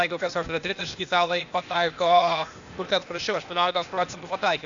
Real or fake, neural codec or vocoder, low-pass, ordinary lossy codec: fake; codec, 16 kHz, 0.8 kbps, ZipCodec; 7.2 kHz; Opus, 64 kbps